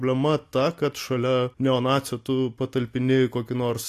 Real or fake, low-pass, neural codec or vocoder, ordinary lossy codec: real; 14.4 kHz; none; AAC, 64 kbps